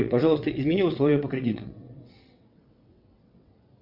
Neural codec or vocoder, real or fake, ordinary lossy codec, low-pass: vocoder, 22.05 kHz, 80 mel bands, WaveNeXt; fake; MP3, 48 kbps; 5.4 kHz